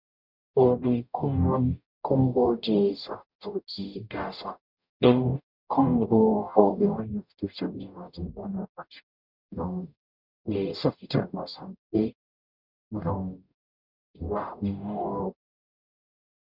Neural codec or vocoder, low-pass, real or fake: codec, 44.1 kHz, 0.9 kbps, DAC; 5.4 kHz; fake